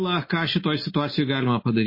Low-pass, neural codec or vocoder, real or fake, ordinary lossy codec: 5.4 kHz; none; real; MP3, 24 kbps